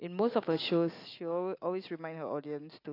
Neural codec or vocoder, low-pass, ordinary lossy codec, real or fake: none; 5.4 kHz; AAC, 32 kbps; real